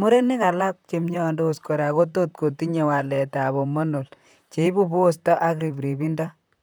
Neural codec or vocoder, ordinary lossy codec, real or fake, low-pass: vocoder, 44.1 kHz, 128 mel bands, Pupu-Vocoder; none; fake; none